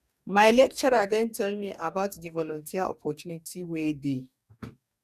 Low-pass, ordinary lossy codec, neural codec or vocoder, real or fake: 14.4 kHz; none; codec, 44.1 kHz, 2.6 kbps, DAC; fake